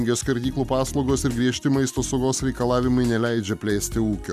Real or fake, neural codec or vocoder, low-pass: real; none; 14.4 kHz